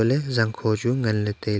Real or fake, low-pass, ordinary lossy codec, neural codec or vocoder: real; none; none; none